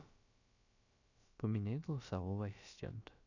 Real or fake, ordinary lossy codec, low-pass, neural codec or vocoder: fake; none; 7.2 kHz; codec, 16 kHz, about 1 kbps, DyCAST, with the encoder's durations